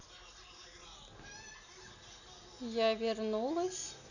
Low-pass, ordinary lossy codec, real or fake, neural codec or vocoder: 7.2 kHz; none; real; none